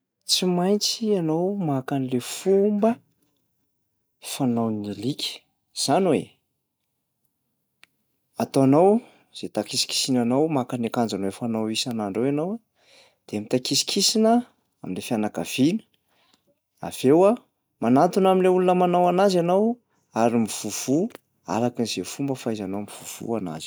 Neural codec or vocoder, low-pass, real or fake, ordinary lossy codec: none; none; real; none